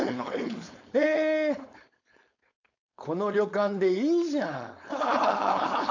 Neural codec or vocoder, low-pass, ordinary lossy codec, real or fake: codec, 16 kHz, 4.8 kbps, FACodec; 7.2 kHz; AAC, 48 kbps; fake